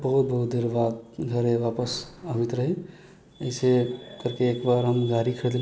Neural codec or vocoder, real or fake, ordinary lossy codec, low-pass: none; real; none; none